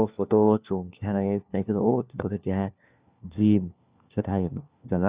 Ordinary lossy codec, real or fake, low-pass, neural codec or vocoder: Opus, 64 kbps; fake; 3.6 kHz; codec, 16 kHz, 1 kbps, FunCodec, trained on LibriTTS, 50 frames a second